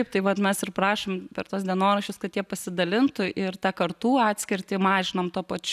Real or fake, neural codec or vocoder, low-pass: real; none; 14.4 kHz